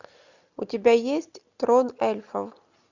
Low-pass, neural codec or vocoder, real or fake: 7.2 kHz; none; real